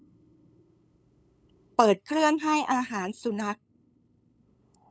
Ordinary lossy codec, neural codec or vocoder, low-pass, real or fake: none; codec, 16 kHz, 8 kbps, FunCodec, trained on LibriTTS, 25 frames a second; none; fake